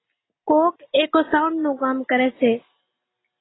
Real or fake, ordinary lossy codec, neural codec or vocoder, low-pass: real; AAC, 16 kbps; none; 7.2 kHz